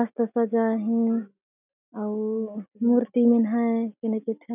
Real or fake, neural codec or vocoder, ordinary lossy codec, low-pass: real; none; none; 3.6 kHz